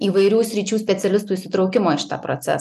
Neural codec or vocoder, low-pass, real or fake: none; 14.4 kHz; real